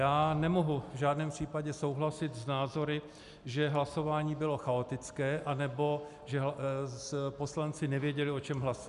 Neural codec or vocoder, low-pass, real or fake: none; 10.8 kHz; real